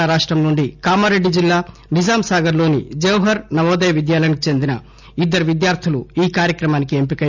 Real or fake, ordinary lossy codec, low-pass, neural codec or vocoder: real; none; 7.2 kHz; none